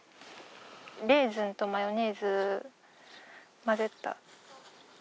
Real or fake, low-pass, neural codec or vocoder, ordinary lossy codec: real; none; none; none